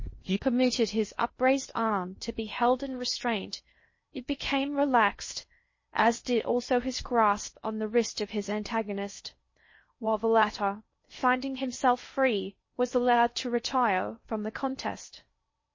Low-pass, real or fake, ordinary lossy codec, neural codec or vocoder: 7.2 kHz; fake; MP3, 32 kbps; codec, 16 kHz in and 24 kHz out, 0.6 kbps, FocalCodec, streaming, 2048 codes